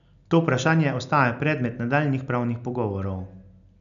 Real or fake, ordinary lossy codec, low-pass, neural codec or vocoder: real; none; 7.2 kHz; none